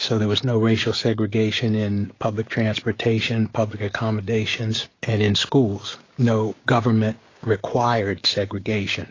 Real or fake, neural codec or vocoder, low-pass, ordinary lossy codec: fake; codec, 16 kHz, 6 kbps, DAC; 7.2 kHz; AAC, 32 kbps